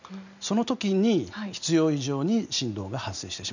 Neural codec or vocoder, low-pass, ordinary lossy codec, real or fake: none; 7.2 kHz; none; real